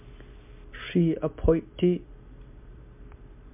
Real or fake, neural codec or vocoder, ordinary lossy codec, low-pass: real; none; MP3, 32 kbps; 3.6 kHz